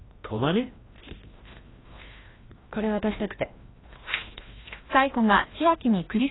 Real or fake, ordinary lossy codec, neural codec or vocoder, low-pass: fake; AAC, 16 kbps; codec, 16 kHz, 1 kbps, FreqCodec, larger model; 7.2 kHz